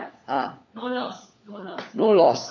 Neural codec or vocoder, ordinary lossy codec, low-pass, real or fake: codec, 16 kHz, 4 kbps, FunCodec, trained on LibriTTS, 50 frames a second; none; 7.2 kHz; fake